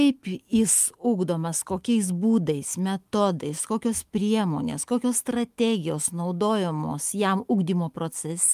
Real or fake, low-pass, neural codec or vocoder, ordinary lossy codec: fake; 14.4 kHz; autoencoder, 48 kHz, 128 numbers a frame, DAC-VAE, trained on Japanese speech; Opus, 32 kbps